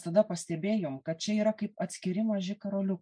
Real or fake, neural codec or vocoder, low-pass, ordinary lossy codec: real; none; 9.9 kHz; MP3, 96 kbps